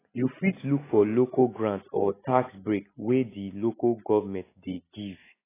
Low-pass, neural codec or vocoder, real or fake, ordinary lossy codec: 3.6 kHz; none; real; AAC, 16 kbps